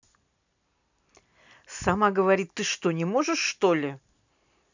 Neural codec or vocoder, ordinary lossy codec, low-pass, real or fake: none; none; 7.2 kHz; real